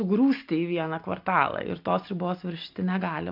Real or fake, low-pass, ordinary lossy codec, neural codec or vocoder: real; 5.4 kHz; MP3, 48 kbps; none